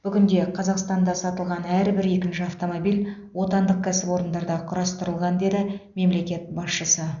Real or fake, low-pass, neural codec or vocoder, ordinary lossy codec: real; 7.2 kHz; none; none